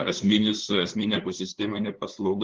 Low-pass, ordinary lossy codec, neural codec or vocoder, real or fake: 7.2 kHz; Opus, 16 kbps; codec, 16 kHz, 2 kbps, FunCodec, trained on LibriTTS, 25 frames a second; fake